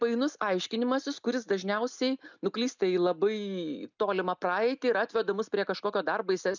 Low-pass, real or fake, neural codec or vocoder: 7.2 kHz; real; none